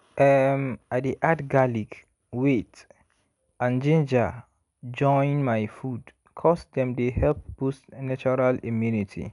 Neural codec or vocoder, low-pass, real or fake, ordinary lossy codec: none; 10.8 kHz; real; none